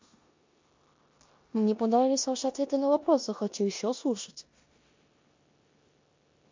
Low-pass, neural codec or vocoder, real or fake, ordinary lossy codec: 7.2 kHz; codec, 16 kHz in and 24 kHz out, 0.9 kbps, LongCat-Audio-Codec, four codebook decoder; fake; MP3, 48 kbps